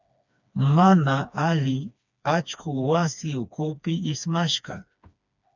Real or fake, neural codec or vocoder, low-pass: fake; codec, 16 kHz, 2 kbps, FreqCodec, smaller model; 7.2 kHz